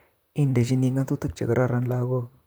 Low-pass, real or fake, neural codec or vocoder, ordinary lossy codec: none; fake; vocoder, 44.1 kHz, 128 mel bands, Pupu-Vocoder; none